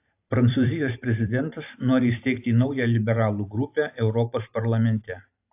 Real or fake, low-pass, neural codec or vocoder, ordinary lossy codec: fake; 3.6 kHz; vocoder, 44.1 kHz, 128 mel bands every 512 samples, BigVGAN v2; AAC, 32 kbps